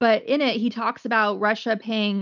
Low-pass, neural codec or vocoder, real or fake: 7.2 kHz; none; real